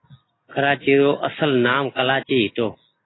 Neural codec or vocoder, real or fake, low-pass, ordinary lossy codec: none; real; 7.2 kHz; AAC, 16 kbps